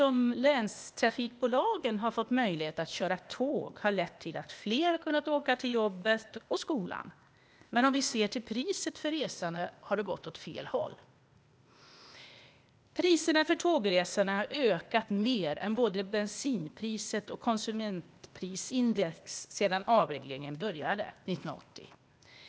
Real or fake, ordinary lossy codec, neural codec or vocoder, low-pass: fake; none; codec, 16 kHz, 0.8 kbps, ZipCodec; none